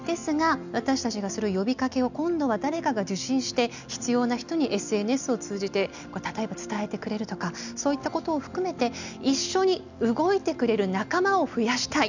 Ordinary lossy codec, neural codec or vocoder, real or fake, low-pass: none; none; real; 7.2 kHz